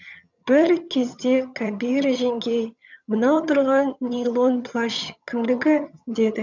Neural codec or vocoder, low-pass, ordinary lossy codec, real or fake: vocoder, 22.05 kHz, 80 mel bands, HiFi-GAN; 7.2 kHz; none; fake